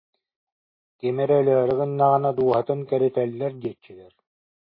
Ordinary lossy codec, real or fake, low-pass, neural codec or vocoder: MP3, 24 kbps; real; 5.4 kHz; none